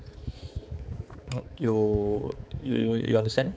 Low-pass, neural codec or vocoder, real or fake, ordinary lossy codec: none; codec, 16 kHz, 4 kbps, X-Codec, HuBERT features, trained on balanced general audio; fake; none